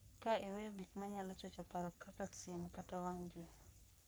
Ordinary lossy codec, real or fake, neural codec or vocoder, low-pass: none; fake; codec, 44.1 kHz, 3.4 kbps, Pupu-Codec; none